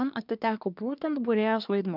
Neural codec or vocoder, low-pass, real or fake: codec, 24 kHz, 1 kbps, SNAC; 5.4 kHz; fake